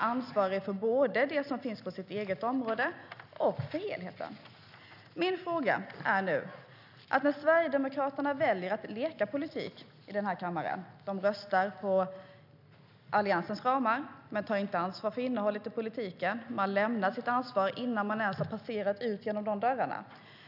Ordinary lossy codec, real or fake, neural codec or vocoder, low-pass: none; real; none; 5.4 kHz